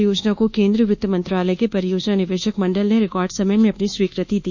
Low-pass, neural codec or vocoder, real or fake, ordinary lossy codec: 7.2 kHz; codec, 24 kHz, 1.2 kbps, DualCodec; fake; none